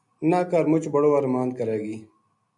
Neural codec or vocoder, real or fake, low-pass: none; real; 10.8 kHz